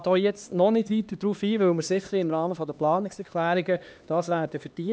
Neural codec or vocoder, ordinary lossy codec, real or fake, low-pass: codec, 16 kHz, 2 kbps, X-Codec, HuBERT features, trained on LibriSpeech; none; fake; none